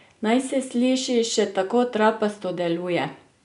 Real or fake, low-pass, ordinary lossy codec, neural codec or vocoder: real; 10.8 kHz; none; none